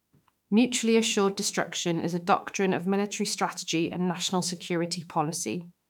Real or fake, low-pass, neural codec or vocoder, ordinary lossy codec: fake; 19.8 kHz; autoencoder, 48 kHz, 32 numbers a frame, DAC-VAE, trained on Japanese speech; none